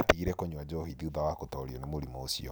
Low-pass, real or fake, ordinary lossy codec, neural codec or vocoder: none; real; none; none